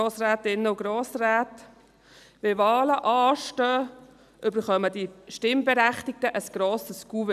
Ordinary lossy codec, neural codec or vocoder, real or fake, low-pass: none; none; real; 14.4 kHz